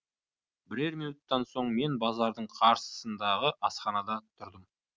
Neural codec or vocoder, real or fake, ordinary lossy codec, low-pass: none; real; none; none